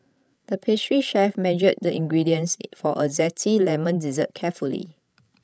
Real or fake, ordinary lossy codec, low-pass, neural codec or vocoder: fake; none; none; codec, 16 kHz, 16 kbps, FreqCodec, larger model